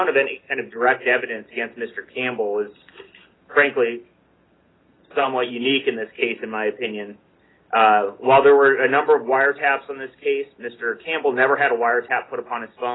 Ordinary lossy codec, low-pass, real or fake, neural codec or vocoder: AAC, 16 kbps; 7.2 kHz; real; none